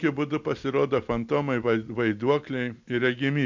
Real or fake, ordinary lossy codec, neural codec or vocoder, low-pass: real; MP3, 64 kbps; none; 7.2 kHz